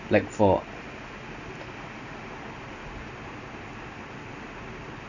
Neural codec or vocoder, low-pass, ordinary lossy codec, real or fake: none; 7.2 kHz; none; real